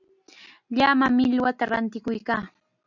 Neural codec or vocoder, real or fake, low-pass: none; real; 7.2 kHz